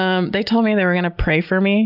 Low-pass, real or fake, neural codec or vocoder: 5.4 kHz; real; none